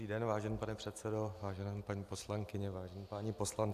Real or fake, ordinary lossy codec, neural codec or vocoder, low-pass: real; AAC, 96 kbps; none; 14.4 kHz